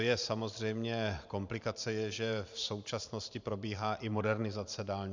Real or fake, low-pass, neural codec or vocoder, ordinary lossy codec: fake; 7.2 kHz; vocoder, 44.1 kHz, 128 mel bands every 512 samples, BigVGAN v2; MP3, 64 kbps